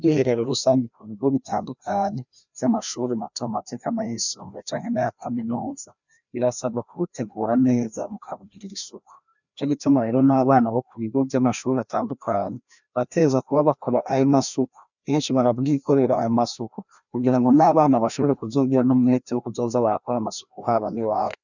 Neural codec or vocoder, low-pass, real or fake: codec, 16 kHz, 1 kbps, FreqCodec, larger model; 7.2 kHz; fake